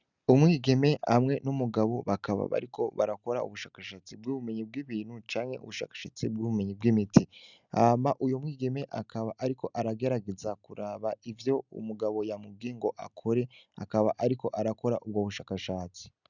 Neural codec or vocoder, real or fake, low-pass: none; real; 7.2 kHz